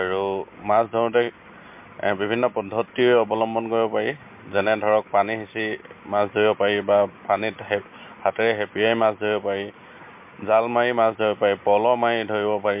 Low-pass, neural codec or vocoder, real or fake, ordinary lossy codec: 3.6 kHz; none; real; MP3, 32 kbps